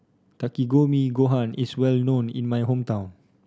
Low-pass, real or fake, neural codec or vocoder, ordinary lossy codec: none; real; none; none